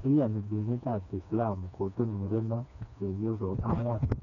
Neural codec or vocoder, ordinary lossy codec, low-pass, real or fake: codec, 16 kHz, 2 kbps, FreqCodec, smaller model; none; 7.2 kHz; fake